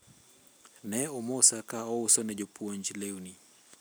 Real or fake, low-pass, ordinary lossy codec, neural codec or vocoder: real; none; none; none